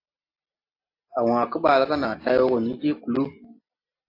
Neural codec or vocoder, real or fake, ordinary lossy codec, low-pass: none; real; AAC, 32 kbps; 5.4 kHz